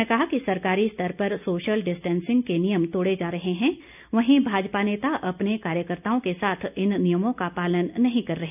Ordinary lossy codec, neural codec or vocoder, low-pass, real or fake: none; none; 3.6 kHz; real